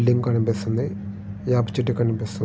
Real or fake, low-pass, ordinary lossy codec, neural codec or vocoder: real; none; none; none